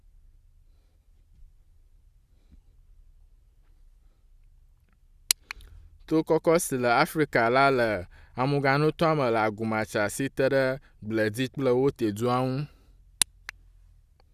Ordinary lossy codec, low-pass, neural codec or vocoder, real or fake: Opus, 64 kbps; 14.4 kHz; none; real